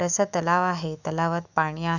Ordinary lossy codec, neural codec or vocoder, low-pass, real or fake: none; autoencoder, 48 kHz, 128 numbers a frame, DAC-VAE, trained on Japanese speech; 7.2 kHz; fake